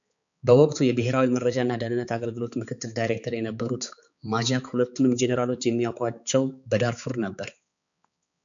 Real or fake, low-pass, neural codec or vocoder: fake; 7.2 kHz; codec, 16 kHz, 4 kbps, X-Codec, HuBERT features, trained on balanced general audio